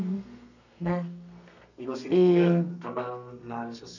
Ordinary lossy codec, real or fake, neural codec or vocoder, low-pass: none; fake; codec, 32 kHz, 1.9 kbps, SNAC; 7.2 kHz